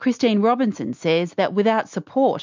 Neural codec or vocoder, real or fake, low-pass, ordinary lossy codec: none; real; 7.2 kHz; MP3, 64 kbps